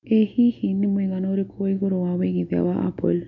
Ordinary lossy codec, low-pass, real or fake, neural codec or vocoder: none; 7.2 kHz; real; none